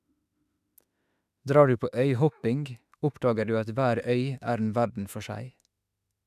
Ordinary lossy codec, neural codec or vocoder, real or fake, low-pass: none; autoencoder, 48 kHz, 32 numbers a frame, DAC-VAE, trained on Japanese speech; fake; 14.4 kHz